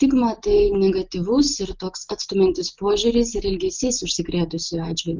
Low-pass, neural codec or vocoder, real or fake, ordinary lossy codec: 7.2 kHz; none; real; Opus, 32 kbps